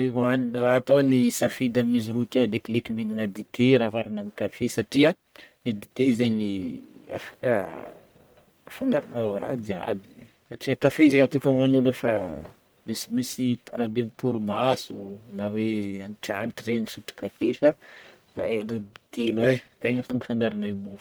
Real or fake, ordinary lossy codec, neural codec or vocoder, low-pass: fake; none; codec, 44.1 kHz, 1.7 kbps, Pupu-Codec; none